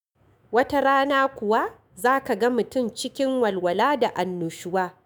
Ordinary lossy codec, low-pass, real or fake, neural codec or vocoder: none; none; fake; autoencoder, 48 kHz, 128 numbers a frame, DAC-VAE, trained on Japanese speech